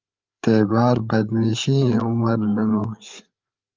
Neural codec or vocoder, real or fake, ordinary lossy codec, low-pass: codec, 16 kHz, 8 kbps, FreqCodec, larger model; fake; Opus, 24 kbps; 7.2 kHz